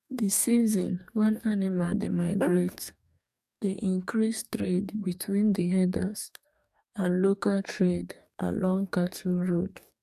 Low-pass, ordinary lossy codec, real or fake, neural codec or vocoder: 14.4 kHz; none; fake; codec, 44.1 kHz, 2.6 kbps, DAC